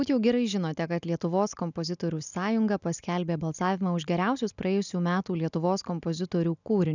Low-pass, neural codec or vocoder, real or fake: 7.2 kHz; none; real